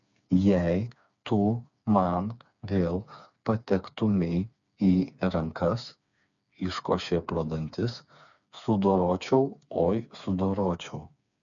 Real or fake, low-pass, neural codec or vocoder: fake; 7.2 kHz; codec, 16 kHz, 4 kbps, FreqCodec, smaller model